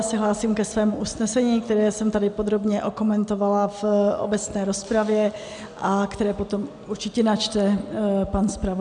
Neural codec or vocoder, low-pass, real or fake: none; 9.9 kHz; real